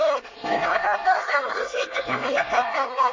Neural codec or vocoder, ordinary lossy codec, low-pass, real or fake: codec, 24 kHz, 1 kbps, SNAC; MP3, 32 kbps; 7.2 kHz; fake